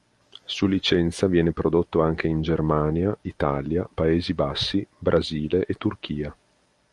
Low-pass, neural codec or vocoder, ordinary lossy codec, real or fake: 10.8 kHz; none; Opus, 32 kbps; real